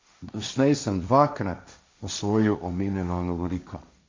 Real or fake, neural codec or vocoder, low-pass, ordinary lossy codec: fake; codec, 16 kHz, 1.1 kbps, Voila-Tokenizer; 7.2 kHz; MP3, 64 kbps